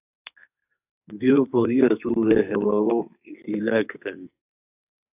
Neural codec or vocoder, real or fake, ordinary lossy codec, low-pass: codec, 44.1 kHz, 2.6 kbps, SNAC; fake; AAC, 32 kbps; 3.6 kHz